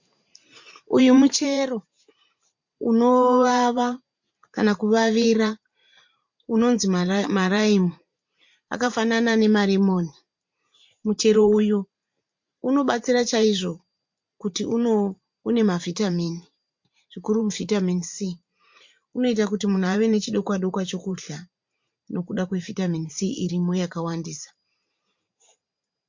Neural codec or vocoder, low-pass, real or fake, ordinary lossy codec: vocoder, 44.1 kHz, 128 mel bands every 512 samples, BigVGAN v2; 7.2 kHz; fake; MP3, 48 kbps